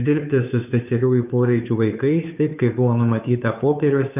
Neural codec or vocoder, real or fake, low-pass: codec, 16 kHz, 2 kbps, FunCodec, trained on Chinese and English, 25 frames a second; fake; 3.6 kHz